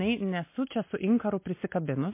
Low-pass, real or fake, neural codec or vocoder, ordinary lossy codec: 3.6 kHz; real; none; MP3, 24 kbps